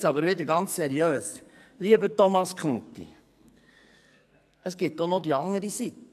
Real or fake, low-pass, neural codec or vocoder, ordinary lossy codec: fake; 14.4 kHz; codec, 44.1 kHz, 2.6 kbps, SNAC; AAC, 96 kbps